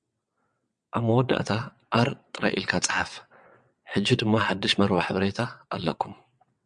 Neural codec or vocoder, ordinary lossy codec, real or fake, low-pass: vocoder, 22.05 kHz, 80 mel bands, WaveNeXt; MP3, 96 kbps; fake; 9.9 kHz